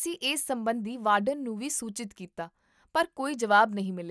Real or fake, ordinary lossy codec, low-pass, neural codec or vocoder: real; none; none; none